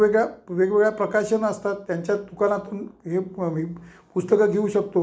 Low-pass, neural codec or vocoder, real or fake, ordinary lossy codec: none; none; real; none